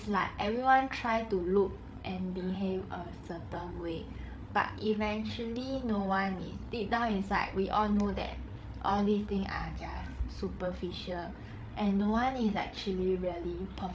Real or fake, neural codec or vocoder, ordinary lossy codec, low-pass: fake; codec, 16 kHz, 8 kbps, FreqCodec, larger model; none; none